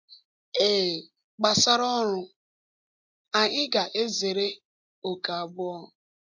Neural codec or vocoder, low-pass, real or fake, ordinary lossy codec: none; 7.2 kHz; real; AAC, 48 kbps